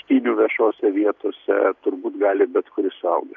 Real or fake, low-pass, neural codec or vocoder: real; 7.2 kHz; none